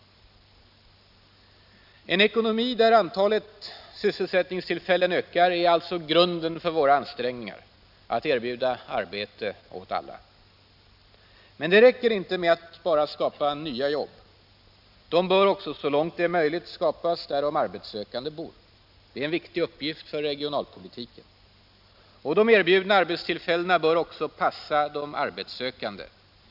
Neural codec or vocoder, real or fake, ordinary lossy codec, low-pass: none; real; none; 5.4 kHz